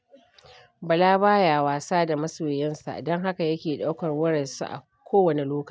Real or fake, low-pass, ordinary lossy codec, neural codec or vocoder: real; none; none; none